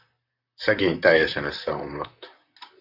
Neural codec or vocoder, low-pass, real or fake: vocoder, 44.1 kHz, 128 mel bands, Pupu-Vocoder; 5.4 kHz; fake